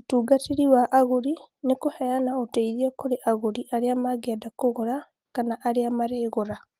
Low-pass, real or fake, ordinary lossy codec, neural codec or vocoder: 14.4 kHz; real; Opus, 24 kbps; none